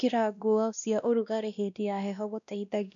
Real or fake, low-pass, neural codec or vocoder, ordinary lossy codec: fake; 7.2 kHz; codec, 16 kHz, 1 kbps, X-Codec, WavLM features, trained on Multilingual LibriSpeech; none